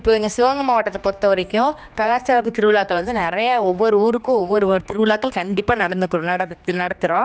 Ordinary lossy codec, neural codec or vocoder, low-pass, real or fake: none; codec, 16 kHz, 2 kbps, X-Codec, HuBERT features, trained on general audio; none; fake